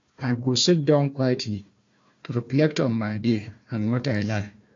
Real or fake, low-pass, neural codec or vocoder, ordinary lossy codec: fake; 7.2 kHz; codec, 16 kHz, 1 kbps, FunCodec, trained on Chinese and English, 50 frames a second; AAC, 48 kbps